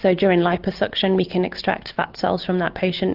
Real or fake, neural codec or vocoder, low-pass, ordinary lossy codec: real; none; 5.4 kHz; Opus, 24 kbps